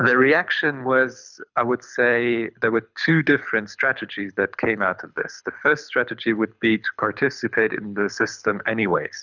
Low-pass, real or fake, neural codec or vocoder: 7.2 kHz; fake; codec, 24 kHz, 6 kbps, HILCodec